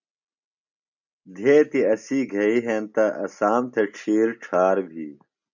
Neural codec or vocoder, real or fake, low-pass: none; real; 7.2 kHz